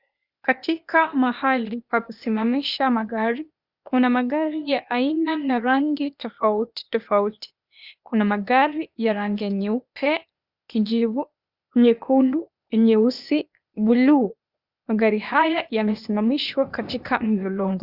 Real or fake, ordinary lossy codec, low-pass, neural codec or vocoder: fake; Opus, 64 kbps; 5.4 kHz; codec, 16 kHz, 0.8 kbps, ZipCodec